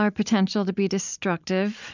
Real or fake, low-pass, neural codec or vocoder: fake; 7.2 kHz; vocoder, 44.1 kHz, 128 mel bands every 256 samples, BigVGAN v2